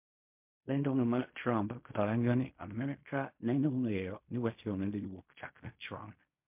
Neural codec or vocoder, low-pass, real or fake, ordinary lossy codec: codec, 16 kHz in and 24 kHz out, 0.4 kbps, LongCat-Audio-Codec, fine tuned four codebook decoder; 3.6 kHz; fake; MP3, 32 kbps